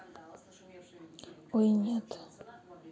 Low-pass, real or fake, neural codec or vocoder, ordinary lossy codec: none; real; none; none